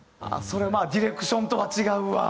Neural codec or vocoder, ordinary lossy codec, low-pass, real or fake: none; none; none; real